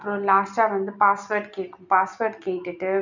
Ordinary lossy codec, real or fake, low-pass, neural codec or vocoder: none; real; 7.2 kHz; none